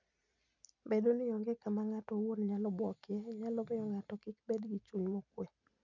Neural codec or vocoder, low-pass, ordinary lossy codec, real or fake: none; 7.2 kHz; none; real